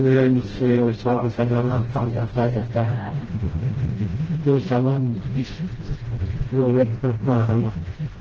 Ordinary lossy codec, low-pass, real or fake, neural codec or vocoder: Opus, 16 kbps; 7.2 kHz; fake; codec, 16 kHz, 0.5 kbps, FreqCodec, smaller model